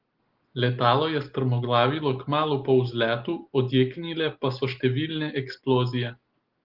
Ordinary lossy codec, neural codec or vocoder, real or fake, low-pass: Opus, 16 kbps; none; real; 5.4 kHz